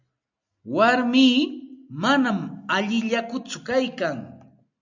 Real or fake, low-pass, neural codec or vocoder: real; 7.2 kHz; none